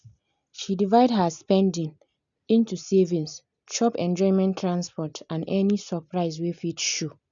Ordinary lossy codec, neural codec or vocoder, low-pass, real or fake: none; none; 7.2 kHz; real